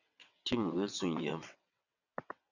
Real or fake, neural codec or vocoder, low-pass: fake; vocoder, 22.05 kHz, 80 mel bands, WaveNeXt; 7.2 kHz